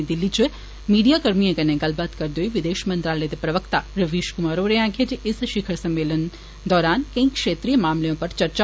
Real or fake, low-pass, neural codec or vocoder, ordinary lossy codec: real; none; none; none